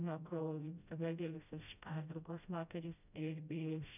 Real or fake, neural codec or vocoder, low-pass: fake; codec, 16 kHz, 0.5 kbps, FreqCodec, smaller model; 3.6 kHz